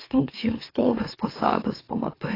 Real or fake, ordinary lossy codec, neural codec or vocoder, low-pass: fake; AAC, 24 kbps; autoencoder, 44.1 kHz, a latent of 192 numbers a frame, MeloTTS; 5.4 kHz